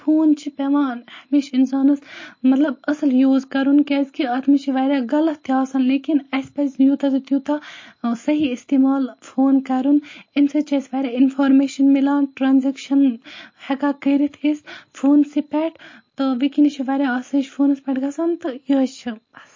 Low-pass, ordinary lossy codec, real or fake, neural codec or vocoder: 7.2 kHz; MP3, 32 kbps; real; none